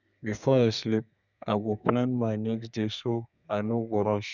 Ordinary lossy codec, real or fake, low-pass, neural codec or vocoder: none; fake; 7.2 kHz; codec, 32 kHz, 1.9 kbps, SNAC